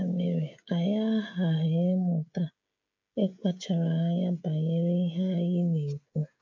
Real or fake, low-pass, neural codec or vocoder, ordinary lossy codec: fake; 7.2 kHz; codec, 16 kHz, 16 kbps, FreqCodec, smaller model; MP3, 64 kbps